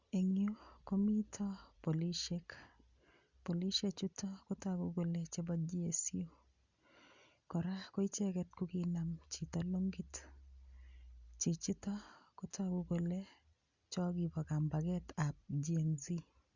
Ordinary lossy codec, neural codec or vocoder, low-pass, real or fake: Opus, 64 kbps; none; 7.2 kHz; real